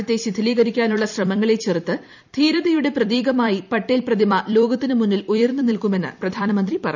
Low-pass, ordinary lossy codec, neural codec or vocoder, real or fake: 7.2 kHz; none; vocoder, 44.1 kHz, 128 mel bands every 256 samples, BigVGAN v2; fake